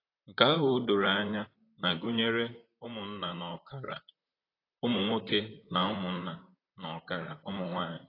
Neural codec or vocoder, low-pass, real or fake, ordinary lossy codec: vocoder, 44.1 kHz, 128 mel bands, Pupu-Vocoder; 5.4 kHz; fake; AAC, 24 kbps